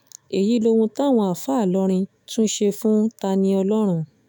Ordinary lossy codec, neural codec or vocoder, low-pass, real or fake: none; autoencoder, 48 kHz, 128 numbers a frame, DAC-VAE, trained on Japanese speech; none; fake